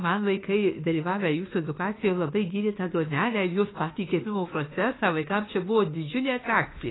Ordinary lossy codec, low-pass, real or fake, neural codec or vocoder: AAC, 16 kbps; 7.2 kHz; fake; autoencoder, 48 kHz, 32 numbers a frame, DAC-VAE, trained on Japanese speech